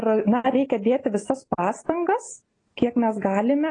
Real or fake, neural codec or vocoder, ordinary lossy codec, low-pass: real; none; AAC, 32 kbps; 9.9 kHz